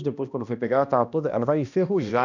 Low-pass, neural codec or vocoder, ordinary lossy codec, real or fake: 7.2 kHz; codec, 16 kHz, 1 kbps, X-Codec, HuBERT features, trained on balanced general audio; none; fake